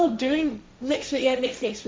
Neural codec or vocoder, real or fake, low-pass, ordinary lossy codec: codec, 16 kHz, 1.1 kbps, Voila-Tokenizer; fake; none; none